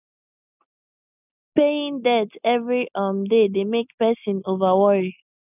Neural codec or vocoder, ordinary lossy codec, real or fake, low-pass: none; none; real; 3.6 kHz